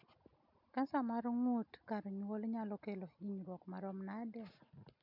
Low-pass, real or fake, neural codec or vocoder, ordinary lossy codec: 5.4 kHz; real; none; MP3, 48 kbps